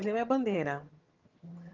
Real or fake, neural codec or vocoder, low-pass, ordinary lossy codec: fake; vocoder, 22.05 kHz, 80 mel bands, HiFi-GAN; 7.2 kHz; Opus, 32 kbps